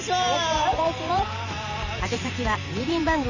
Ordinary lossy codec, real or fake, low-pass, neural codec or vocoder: none; fake; 7.2 kHz; vocoder, 44.1 kHz, 128 mel bands every 512 samples, BigVGAN v2